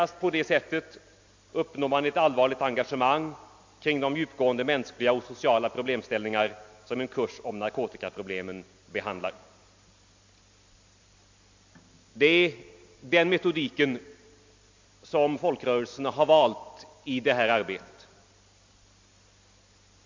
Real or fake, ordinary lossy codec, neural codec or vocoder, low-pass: real; MP3, 48 kbps; none; 7.2 kHz